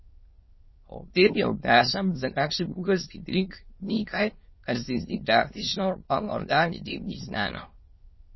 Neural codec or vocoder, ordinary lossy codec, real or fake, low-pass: autoencoder, 22.05 kHz, a latent of 192 numbers a frame, VITS, trained on many speakers; MP3, 24 kbps; fake; 7.2 kHz